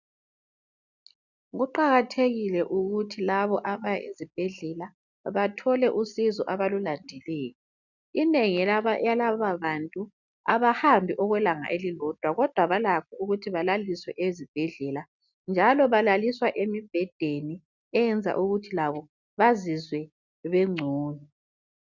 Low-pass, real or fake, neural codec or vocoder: 7.2 kHz; real; none